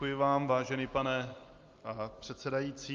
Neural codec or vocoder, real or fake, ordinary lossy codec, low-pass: none; real; Opus, 32 kbps; 7.2 kHz